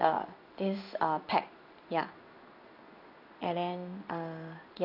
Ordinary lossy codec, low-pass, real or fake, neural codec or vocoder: none; 5.4 kHz; fake; codec, 16 kHz in and 24 kHz out, 1 kbps, XY-Tokenizer